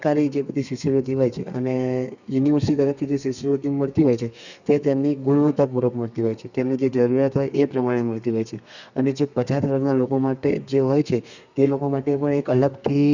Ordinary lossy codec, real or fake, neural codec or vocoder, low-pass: none; fake; codec, 32 kHz, 1.9 kbps, SNAC; 7.2 kHz